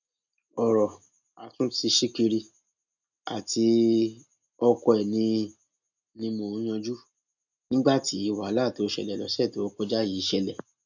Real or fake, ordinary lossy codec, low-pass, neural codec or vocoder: real; none; 7.2 kHz; none